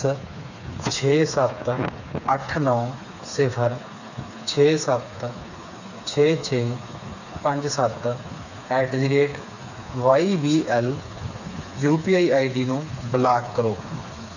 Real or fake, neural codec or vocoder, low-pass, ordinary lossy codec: fake; codec, 16 kHz, 4 kbps, FreqCodec, smaller model; 7.2 kHz; none